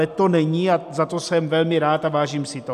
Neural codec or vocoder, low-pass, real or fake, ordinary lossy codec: none; 14.4 kHz; real; AAC, 96 kbps